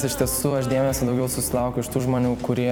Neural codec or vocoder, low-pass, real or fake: vocoder, 44.1 kHz, 128 mel bands every 256 samples, BigVGAN v2; 19.8 kHz; fake